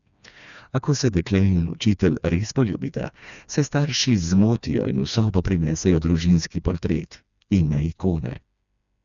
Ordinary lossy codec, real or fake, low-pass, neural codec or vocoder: none; fake; 7.2 kHz; codec, 16 kHz, 2 kbps, FreqCodec, smaller model